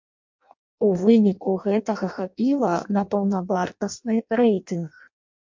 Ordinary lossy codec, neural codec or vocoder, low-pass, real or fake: MP3, 48 kbps; codec, 16 kHz in and 24 kHz out, 0.6 kbps, FireRedTTS-2 codec; 7.2 kHz; fake